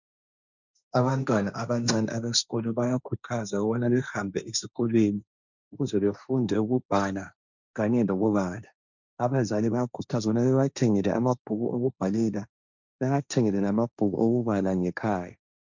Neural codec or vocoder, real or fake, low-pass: codec, 16 kHz, 1.1 kbps, Voila-Tokenizer; fake; 7.2 kHz